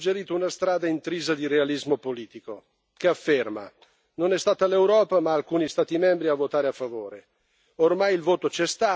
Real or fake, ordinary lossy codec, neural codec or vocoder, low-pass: real; none; none; none